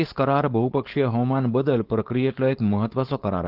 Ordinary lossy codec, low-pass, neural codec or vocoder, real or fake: Opus, 16 kbps; 5.4 kHz; codec, 16 kHz, 4.8 kbps, FACodec; fake